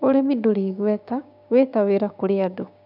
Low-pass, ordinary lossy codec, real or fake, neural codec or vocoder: 5.4 kHz; none; fake; codec, 16 kHz, 6 kbps, DAC